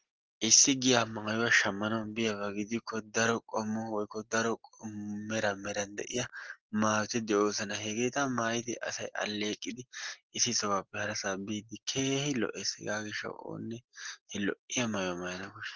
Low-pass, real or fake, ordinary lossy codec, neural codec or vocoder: 7.2 kHz; real; Opus, 16 kbps; none